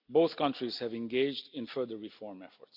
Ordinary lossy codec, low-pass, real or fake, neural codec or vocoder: none; 5.4 kHz; real; none